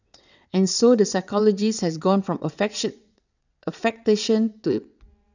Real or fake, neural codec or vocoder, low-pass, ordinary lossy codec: fake; vocoder, 22.05 kHz, 80 mel bands, WaveNeXt; 7.2 kHz; none